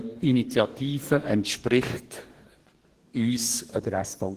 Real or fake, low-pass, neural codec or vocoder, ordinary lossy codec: fake; 14.4 kHz; codec, 44.1 kHz, 2.6 kbps, DAC; Opus, 16 kbps